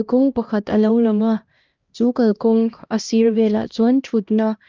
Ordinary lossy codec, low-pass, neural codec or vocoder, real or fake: Opus, 24 kbps; 7.2 kHz; codec, 16 kHz, 1 kbps, X-Codec, HuBERT features, trained on LibriSpeech; fake